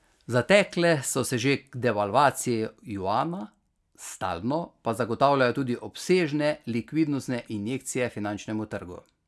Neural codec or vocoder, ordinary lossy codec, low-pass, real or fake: none; none; none; real